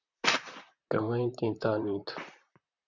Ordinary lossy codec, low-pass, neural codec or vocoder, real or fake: Opus, 64 kbps; 7.2 kHz; vocoder, 44.1 kHz, 128 mel bands, Pupu-Vocoder; fake